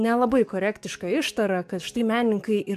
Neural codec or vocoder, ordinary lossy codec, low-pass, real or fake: codec, 44.1 kHz, 7.8 kbps, DAC; AAC, 96 kbps; 14.4 kHz; fake